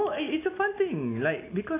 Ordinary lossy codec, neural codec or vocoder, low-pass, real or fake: AAC, 24 kbps; none; 3.6 kHz; real